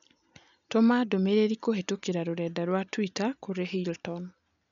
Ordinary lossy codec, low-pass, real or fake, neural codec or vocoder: none; 7.2 kHz; real; none